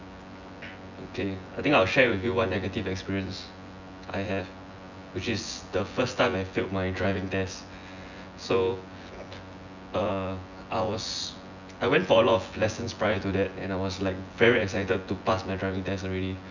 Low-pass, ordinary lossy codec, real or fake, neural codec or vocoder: 7.2 kHz; none; fake; vocoder, 24 kHz, 100 mel bands, Vocos